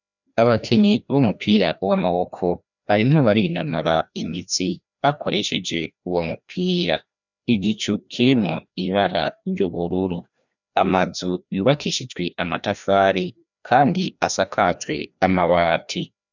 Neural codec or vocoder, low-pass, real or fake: codec, 16 kHz, 1 kbps, FreqCodec, larger model; 7.2 kHz; fake